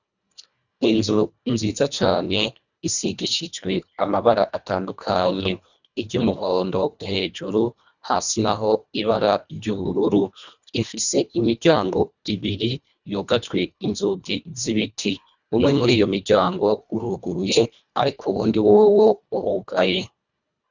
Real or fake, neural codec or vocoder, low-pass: fake; codec, 24 kHz, 1.5 kbps, HILCodec; 7.2 kHz